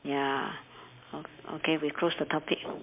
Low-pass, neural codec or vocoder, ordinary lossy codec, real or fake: 3.6 kHz; none; MP3, 32 kbps; real